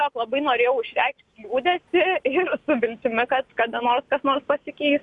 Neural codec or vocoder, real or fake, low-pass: none; real; 10.8 kHz